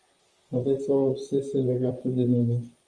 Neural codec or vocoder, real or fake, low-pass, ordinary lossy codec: vocoder, 44.1 kHz, 128 mel bands, Pupu-Vocoder; fake; 9.9 kHz; Opus, 32 kbps